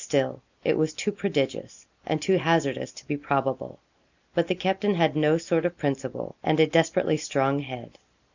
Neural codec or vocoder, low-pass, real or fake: none; 7.2 kHz; real